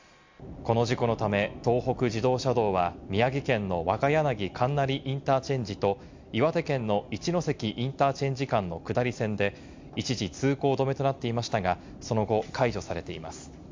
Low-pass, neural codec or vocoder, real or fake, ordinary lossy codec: 7.2 kHz; none; real; MP3, 64 kbps